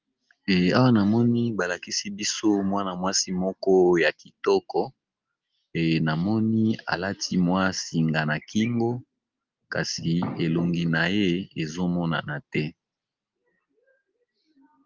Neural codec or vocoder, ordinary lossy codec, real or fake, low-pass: none; Opus, 32 kbps; real; 7.2 kHz